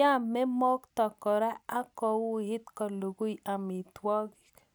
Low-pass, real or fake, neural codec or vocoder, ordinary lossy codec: none; real; none; none